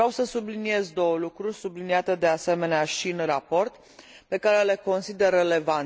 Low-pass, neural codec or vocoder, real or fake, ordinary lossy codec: none; none; real; none